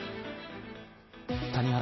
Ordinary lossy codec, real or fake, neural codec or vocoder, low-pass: MP3, 24 kbps; real; none; 7.2 kHz